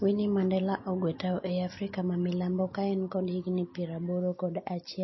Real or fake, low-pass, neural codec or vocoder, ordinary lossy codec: real; 7.2 kHz; none; MP3, 24 kbps